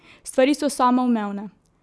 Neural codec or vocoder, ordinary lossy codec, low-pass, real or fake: none; none; none; real